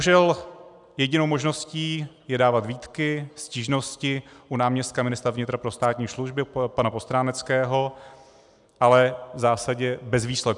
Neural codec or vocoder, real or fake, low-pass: none; real; 10.8 kHz